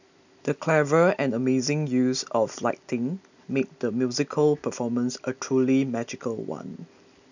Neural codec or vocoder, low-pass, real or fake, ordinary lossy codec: none; 7.2 kHz; real; none